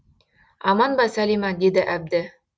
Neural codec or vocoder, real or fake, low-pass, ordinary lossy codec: none; real; none; none